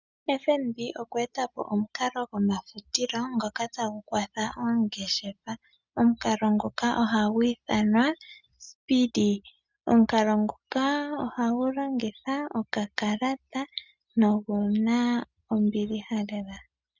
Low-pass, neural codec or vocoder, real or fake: 7.2 kHz; none; real